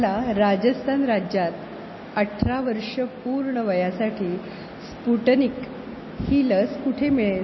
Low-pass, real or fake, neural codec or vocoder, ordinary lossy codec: 7.2 kHz; real; none; MP3, 24 kbps